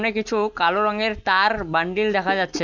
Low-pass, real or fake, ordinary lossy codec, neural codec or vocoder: 7.2 kHz; real; none; none